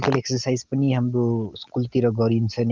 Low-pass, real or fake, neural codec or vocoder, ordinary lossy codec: 7.2 kHz; real; none; Opus, 24 kbps